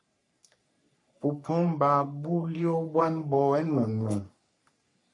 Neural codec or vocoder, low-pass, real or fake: codec, 44.1 kHz, 3.4 kbps, Pupu-Codec; 10.8 kHz; fake